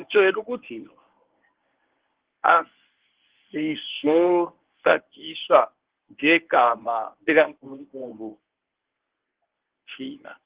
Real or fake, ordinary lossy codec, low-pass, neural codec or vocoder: fake; Opus, 16 kbps; 3.6 kHz; codec, 24 kHz, 0.9 kbps, WavTokenizer, medium speech release version 1